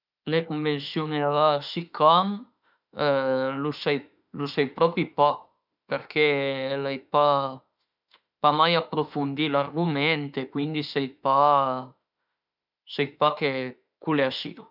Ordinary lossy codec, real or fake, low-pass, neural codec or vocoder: none; fake; 5.4 kHz; autoencoder, 48 kHz, 32 numbers a frame, DAC-VAE, trained on Japanese speech